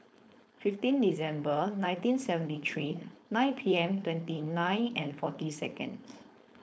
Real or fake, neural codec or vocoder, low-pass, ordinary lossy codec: fake; codec, 16 kHz, 4.8 kbps, FACodec; none; none